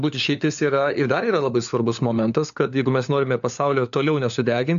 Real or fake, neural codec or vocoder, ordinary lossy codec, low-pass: fake; codec, 16 kHz, 4 kbps, FunCodec, trained on LibriTTS, 50 frames a second; AAC, 96 kbps; 7.2 kHz